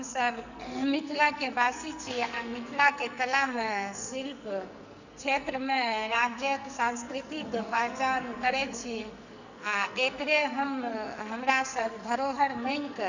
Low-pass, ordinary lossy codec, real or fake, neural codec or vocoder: 7.2 kHz; none; fake; codec, 44.1 kHz, 2.6 kbps, SNAC